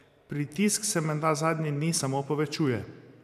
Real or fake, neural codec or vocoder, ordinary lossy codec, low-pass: fake; vocoder, 44.1 kHz, 128 mel bands every 512 samples, BigVGAN v2; none; 14.4 kHz